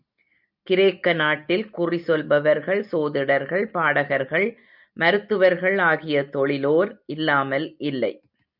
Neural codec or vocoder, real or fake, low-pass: none; real; 5.4 kHz